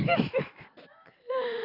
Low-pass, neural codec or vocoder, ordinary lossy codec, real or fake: 5.4 kHz; vocoder, 44.1 kHz, 128 mel bands every 256 samples, BigVGAN v2; none; fake